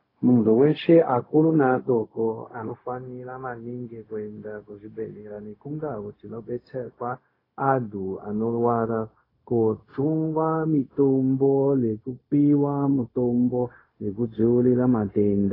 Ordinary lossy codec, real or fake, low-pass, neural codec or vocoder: AAC, 24 kbps; fake; 5.4 kHz; codec, 16 kHz, 0.4 kbps, LongCat-Audio-Codec